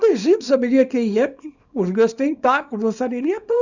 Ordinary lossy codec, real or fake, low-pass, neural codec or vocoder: none; fake; 7.2 kHz; codec, 24 kHz, 0.9 kbps, WavTokenizer, small release